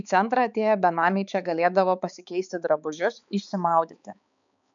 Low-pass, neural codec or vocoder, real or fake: 7.2 kHz; codec, 16 kHz, 4 kbps, X-Codec, HuBERT features, trained on balanced general audio; fake